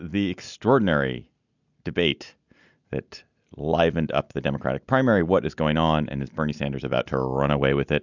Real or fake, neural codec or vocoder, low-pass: real; none; 7.2 kHz